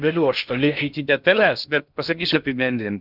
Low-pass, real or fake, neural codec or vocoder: 5.4 kHz; fake; codec, 16 kHz in and 24 kHz out, 0.6 kbps, FocalCodec, streaming, 2048 codes